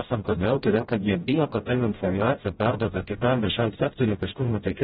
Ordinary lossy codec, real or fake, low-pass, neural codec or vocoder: AAC, 16 kbps; fake; 7.2 kHz; codec, 16 kHz, 0.5 kbps, FreqCodec, smaller model